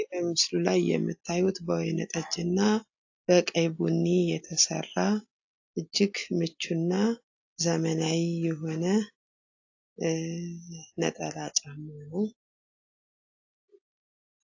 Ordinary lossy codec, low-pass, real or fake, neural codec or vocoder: AAC, 48 kbps; 7.2 kHz; real; none